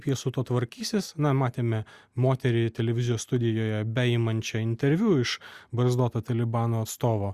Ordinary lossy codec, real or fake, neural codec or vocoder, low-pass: Opus, 64 kbps; real; none; 14.4 kHz